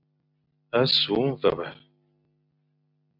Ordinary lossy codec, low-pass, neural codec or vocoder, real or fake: MP3, 48 kbps; 5.4 kHz; none; real